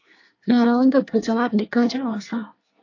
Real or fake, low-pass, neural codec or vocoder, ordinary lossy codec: fake; 7.2 kHz; codec, 24 kHz, 1 kbps, SNAC; AAC, 48 kbps